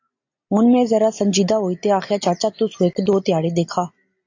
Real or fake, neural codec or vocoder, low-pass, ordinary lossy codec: real; none; 7.2 kHz; AAC, 48 kbps